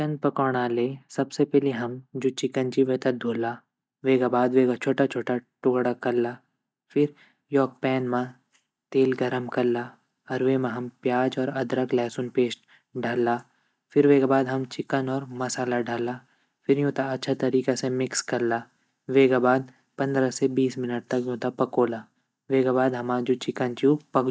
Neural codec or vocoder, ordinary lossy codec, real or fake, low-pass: none; none; real; none